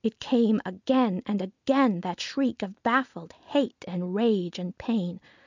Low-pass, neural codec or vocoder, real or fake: 7.2 kHz; none; real